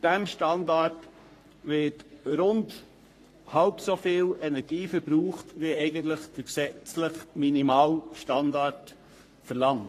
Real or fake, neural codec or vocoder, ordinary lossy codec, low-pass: fake; codec, 44.1 kHz, 3.4 kbps, Pupu-Codec; AAC, 48 kbps; 14.4 kHz